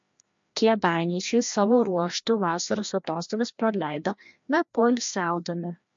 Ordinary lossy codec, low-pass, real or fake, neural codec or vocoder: MP3, 48 kbps; 7.2 kHz; fake; codec, 16 kHz, 1 kbps, FreqCodec, larger model